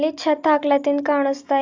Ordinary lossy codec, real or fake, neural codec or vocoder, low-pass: AAC, 48 kbps; real; none; 7.2 kHz